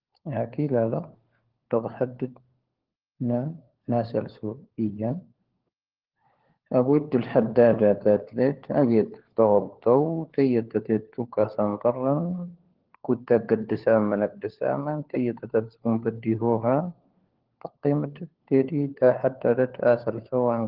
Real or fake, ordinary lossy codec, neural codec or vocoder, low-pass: fake; Opus, 16 kbps; codec, 16 kHz, 4 kbps, FunCodec, trained on LibriTTS, 50 frames a second; 5.4 kHz